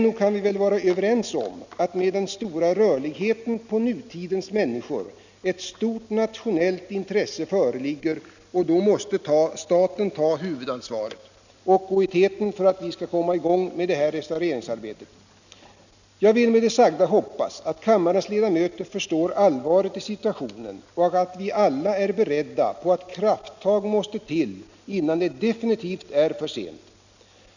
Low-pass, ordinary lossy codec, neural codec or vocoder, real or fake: 7.2 kHz; none; none; real